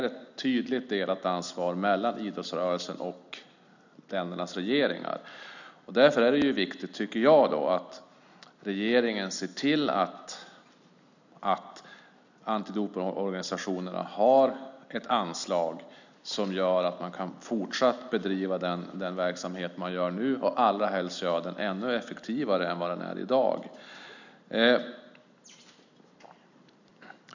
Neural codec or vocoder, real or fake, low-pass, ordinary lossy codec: none; real; 7.2 kHz; none